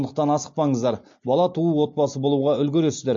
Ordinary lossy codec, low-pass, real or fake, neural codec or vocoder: MP3, 48 kbps; 7.2 kHz; real; none